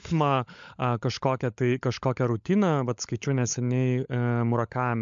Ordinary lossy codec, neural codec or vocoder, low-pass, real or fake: MP3, 64 kbps; codec, 16 kHz, 16 kbps, FunCodec, trained on LibriTTS, 50 frames a second; 7.2 kHz; fake